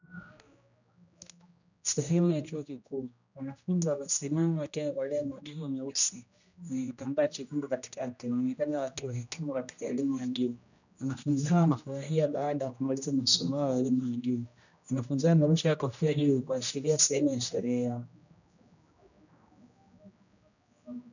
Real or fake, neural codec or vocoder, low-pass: fake; codec, 16 kHz, 1 kbps, X-Codec, HuBERT features, trained on general audio; 7.2 kHz